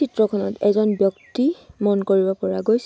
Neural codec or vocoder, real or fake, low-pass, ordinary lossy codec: none; real; none; none